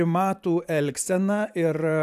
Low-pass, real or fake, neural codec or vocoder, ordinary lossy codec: 14.4 kHz; fake; vocoder, 44.1 kHz, 128 mel bands every 512 samples, BigVGAN v2; AAC, 96 kbps